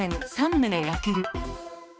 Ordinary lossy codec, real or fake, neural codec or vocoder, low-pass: none; fake; codec, 16 kHz, 2 kbps, X-Codec, HuBERT features, trained on balanced general audio; none